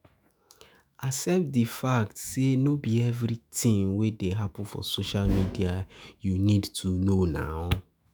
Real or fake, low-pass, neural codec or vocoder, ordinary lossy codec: fake; none; autoencoder, 48 kHz, 128 numbers a frame, DAC-VAE, trained on Japanese speech; none